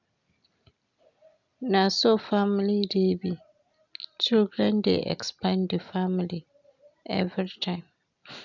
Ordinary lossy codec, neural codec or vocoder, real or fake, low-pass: none; none; real; 7.2 kHz